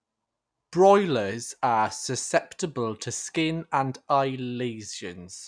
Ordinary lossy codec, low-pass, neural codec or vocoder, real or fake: none; 9.9 kHz; none; real